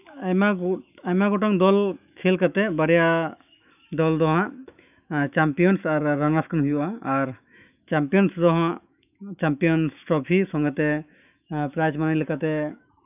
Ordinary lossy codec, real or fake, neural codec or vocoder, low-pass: none; fake; autoencoder, 48 kHz, 128 numbers a frame, DAC-VAE, trained on Japanese speech; 3.6 kHz